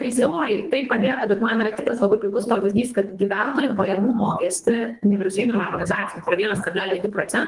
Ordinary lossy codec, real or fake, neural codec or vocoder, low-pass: Opus, 32 kbps; fake; codec, 24 kHz, 1.5 kbps, HILCodec; 10.8 kHz